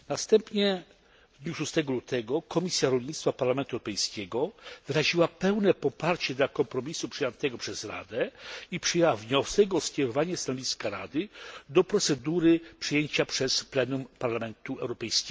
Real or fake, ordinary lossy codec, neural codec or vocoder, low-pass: real; none; none; none